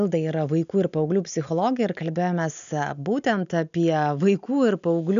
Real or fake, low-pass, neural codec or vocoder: real; 7.2 kHz; none